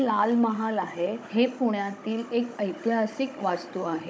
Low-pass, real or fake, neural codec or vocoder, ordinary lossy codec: none; fake; codec, 16 kHz, 16 kbps, FunCodec, trained on Chinese and English, 50 frames a second; none